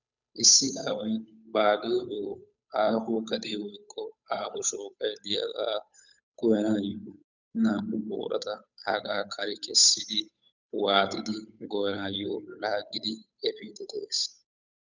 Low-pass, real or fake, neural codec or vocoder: 7.2 kHz; fake; codec, 16 kHz, 8 kbps, FunCodec, trained on Chinese and English, 25 frames a second